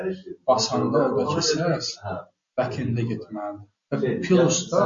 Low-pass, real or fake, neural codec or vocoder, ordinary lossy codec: 7.2 kHz; real; none; MP3, 48 kbps